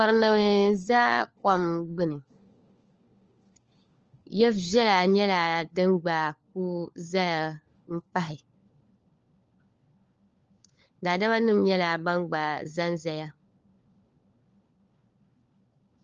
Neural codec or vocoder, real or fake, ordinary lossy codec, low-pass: codec, 16 kHz, 4 kbps, FunCodec, trained on LibriTTS, 50 frames a second; fake; Opus, 24 kbps; 7.2 kHz